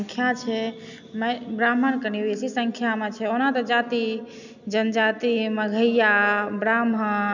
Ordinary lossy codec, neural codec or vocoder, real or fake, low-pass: none; none; real; 7.2 kHz